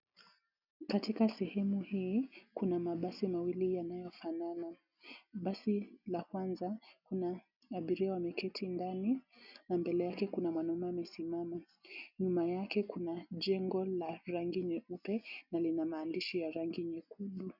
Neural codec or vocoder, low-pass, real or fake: none; 5.4 kHz; real